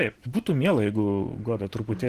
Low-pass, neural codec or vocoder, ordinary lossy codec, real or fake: 14.4 kHz; none; Opus, 16 kbps; real